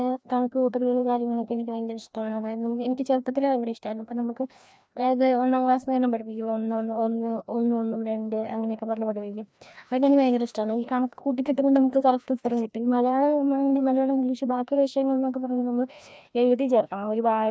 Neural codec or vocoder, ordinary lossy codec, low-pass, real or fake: codec, 16 kHz, 1 kbps, FreqCodec, larger model; none; none; fake